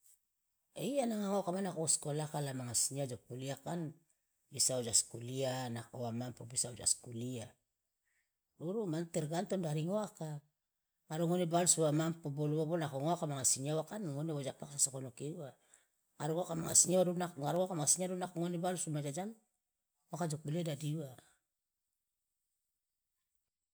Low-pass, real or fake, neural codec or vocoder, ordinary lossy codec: none; real; none; none